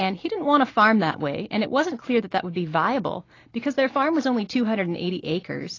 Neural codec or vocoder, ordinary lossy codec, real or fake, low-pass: none; AAC, 32 kbps; real; 7.2 kHz